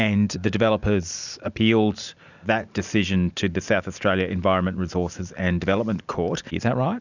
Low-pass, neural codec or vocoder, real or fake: 7.2 kHz; none; real